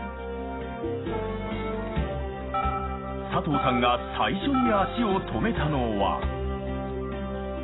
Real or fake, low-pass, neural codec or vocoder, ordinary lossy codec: real; 7.2 kHz; none; AAC, 16 kbps